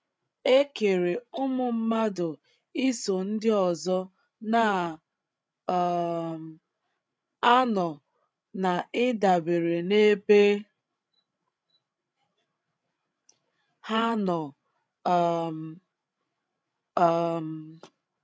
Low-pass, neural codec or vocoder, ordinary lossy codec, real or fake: none; codec, 16 kHz, 16 kbps, FreqCodec, larger model; none; fake